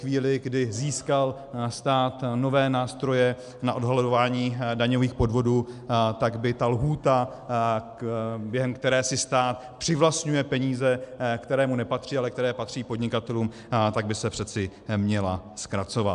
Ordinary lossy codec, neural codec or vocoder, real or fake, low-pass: MP3, 96 kbps; none; real; 10.8 kHz